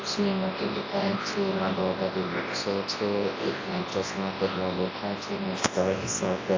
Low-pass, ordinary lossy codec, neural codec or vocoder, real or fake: 7.2 kHz; AAC, 32 kbps; codec, 24 kHz, 0.9 kbps, WavTokenizer, large speech release; fake